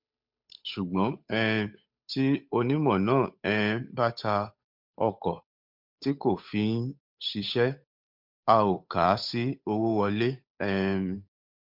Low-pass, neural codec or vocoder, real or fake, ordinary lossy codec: 5.4 kHz; codec, 16 kHz, 8 kbps, FunCodec, trained on Chinese and English, 25 frames a second; fake; MP3, 48 kbps